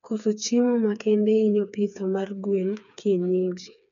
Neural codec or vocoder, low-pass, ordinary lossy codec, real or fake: codec, 16 kHz, 4 kbps, FreqCodec, smaller model; 7.2 kHz; none; fake